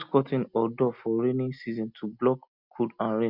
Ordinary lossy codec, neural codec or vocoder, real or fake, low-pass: Opus, 64 kbps; none; real; 5.4 kHz